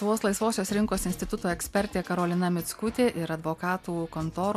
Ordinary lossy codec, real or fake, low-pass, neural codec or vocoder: MP3, 96 kbps; real; 14.4 kHz; none